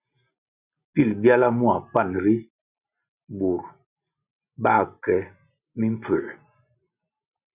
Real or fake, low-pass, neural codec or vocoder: real; 3.6 kHz; none